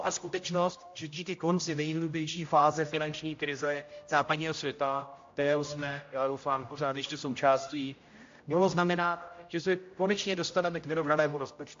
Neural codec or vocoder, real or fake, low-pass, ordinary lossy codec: codec, 16 kHz, 0.5 kbps, X-Codec, HuBERT features, trained on general audio; fake; 7.2 kHz; AAC, 48 kbps